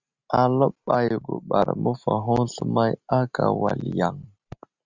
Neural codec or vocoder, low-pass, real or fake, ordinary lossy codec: none; 7.2 kHz; real; Opus, 64 kbps